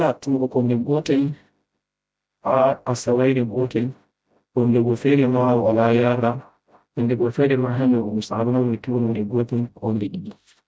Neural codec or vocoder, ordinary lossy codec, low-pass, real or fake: codec, 16 kHz, 0.5 kbps, FreqCodec, smaller model; none; none; fake